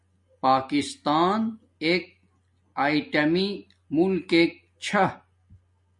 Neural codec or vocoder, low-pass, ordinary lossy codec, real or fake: none; 10.8 kHz; MP3, 48 kbps; real